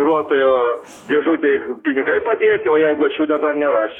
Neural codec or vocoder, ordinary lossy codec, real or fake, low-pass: codec, 44.1 kHz, 2.6 kbps, DAC; MP3, 96 kbps; fake; 19.8 kHz